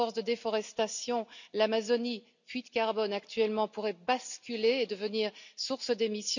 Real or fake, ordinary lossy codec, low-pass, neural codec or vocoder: real; none; 7.2 kHz; none